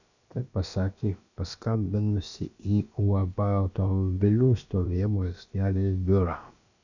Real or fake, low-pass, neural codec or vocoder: fake; 7.2 kHz; codec, 16 kHz, about 1 kbps, DyCAST, with the encoder's durations